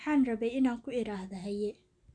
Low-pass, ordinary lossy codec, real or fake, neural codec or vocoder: 9.9 kHz; none; real; none